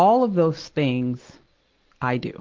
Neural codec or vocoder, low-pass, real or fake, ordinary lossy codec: none; 7.2 kHz; real; Opus, 16 kbps